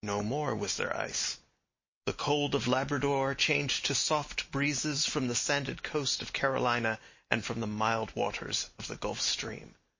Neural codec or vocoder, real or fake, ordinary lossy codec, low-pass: none; real; MP3, 32 kbps; 7.2 kHz